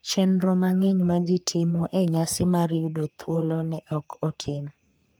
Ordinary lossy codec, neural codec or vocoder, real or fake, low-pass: none; codec, 44.1 kHz, 3.4 kbps, Pupu-Codec; fake; none